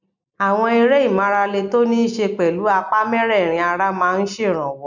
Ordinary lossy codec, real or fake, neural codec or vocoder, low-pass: none; real; none; 7.2 kHz